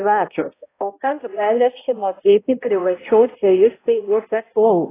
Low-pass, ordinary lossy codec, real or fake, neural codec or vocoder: 3.6 kHz; AAC, 16 kbps; fake; codec, 16 kHz, 0.5 kbps, X-Codec, HuBERT features, trained on balanced general audio